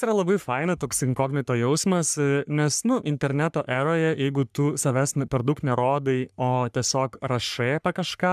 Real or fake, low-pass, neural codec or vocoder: fake; 14.4 kHz; codec, 44.1 kHz, 3.4 kbps, Pupu-Codec